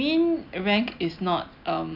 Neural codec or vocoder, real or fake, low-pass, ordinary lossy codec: none; real; 5.4 kHz; none